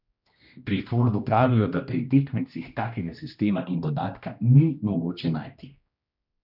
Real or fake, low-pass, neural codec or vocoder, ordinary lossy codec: fake; 5.4 kHz; codec, 16 kHz, 1 kbps, X-Codec, HuBERT features, trained on general audio; none